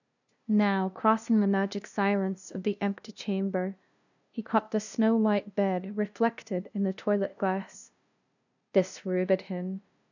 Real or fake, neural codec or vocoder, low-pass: fake; codec, 16 kHz, 0.5 kbps, FunCodec, trained on LibriTTS, 25 frames a second; 7.2 kHz